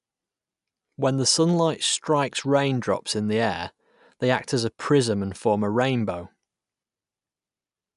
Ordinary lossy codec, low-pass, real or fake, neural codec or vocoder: none; 10.8 kHz; real; none